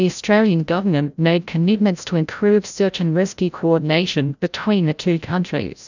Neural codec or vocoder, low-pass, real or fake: codec, 16 kHz, 0.5 kbps, FreqCodec, larger model; 7.2 kHz; fake